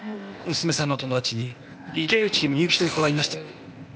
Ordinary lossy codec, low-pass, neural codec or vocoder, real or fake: none; none; codec, 16 kHz, 0.8 kbps, ZipCodec; fake